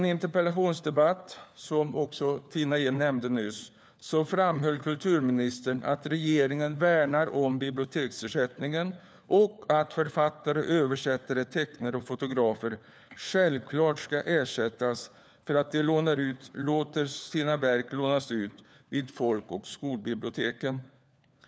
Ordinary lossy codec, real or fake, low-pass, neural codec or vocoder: none; fake; none; codec, 16 kHz, 4 kbps, FunCodec, trained on LibriTTS, 50 frames a second